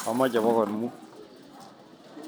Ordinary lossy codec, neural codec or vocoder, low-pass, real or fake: none; none; none; real